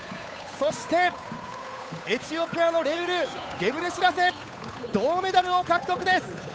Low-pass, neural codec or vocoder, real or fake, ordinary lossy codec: none; codec, 16 kHz, 8 kbps, FunCodec, trained on Chinese and English, 25 frames a second; fake; none